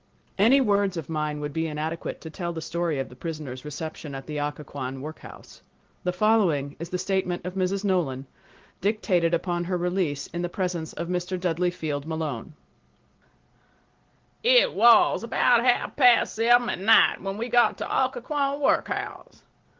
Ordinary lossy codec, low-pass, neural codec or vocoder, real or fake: Opus, 16 kbps; 7.2 kHz; none; real